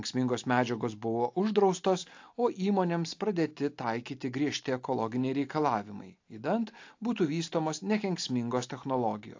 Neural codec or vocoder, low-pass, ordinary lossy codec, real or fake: none; 7.2 kHz; AAC, 48 kbps; real